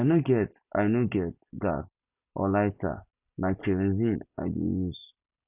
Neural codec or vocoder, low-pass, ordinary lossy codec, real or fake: none; 3.6 kHz; none; real